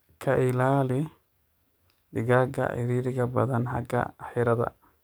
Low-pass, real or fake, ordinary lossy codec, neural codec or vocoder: none; fake; none; codec, 44.1 kHz, 7.8 kbps, DAC